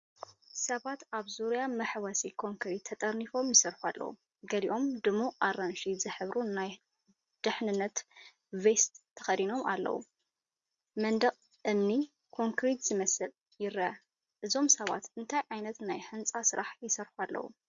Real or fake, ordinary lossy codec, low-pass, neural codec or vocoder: real; Opus, 64 kbps; 7.2 kHz; none